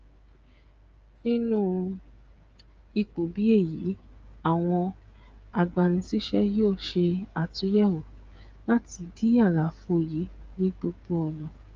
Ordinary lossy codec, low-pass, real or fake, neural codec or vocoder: Opus, 24 kbps; 7.2 kHz; fake; codec, 16 kHz, 8 kbps, FreqCodec, smaller model